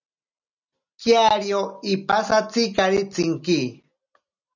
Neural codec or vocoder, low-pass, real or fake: none; 7.2 kHz; real